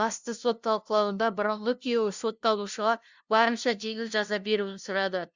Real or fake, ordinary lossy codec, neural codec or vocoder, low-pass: fake; Opus, 64 kbps; codec, 16 kHz, 0.5 kbps, FunCodec, trained on LibriTTS, 25 frames a second; 7.2 kHz